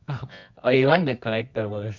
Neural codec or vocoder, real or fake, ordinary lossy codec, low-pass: codec, 24 kHz, 0.9 kbps, WavTokenizer, medium music audio release; fake; MP3, 64 kbps; 7.2 kHz